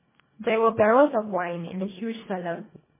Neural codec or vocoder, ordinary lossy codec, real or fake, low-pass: codec, 24 kHz, 1.5 kbps, HILCodec; MP3, 16 kbps; fake; 3.6 kHz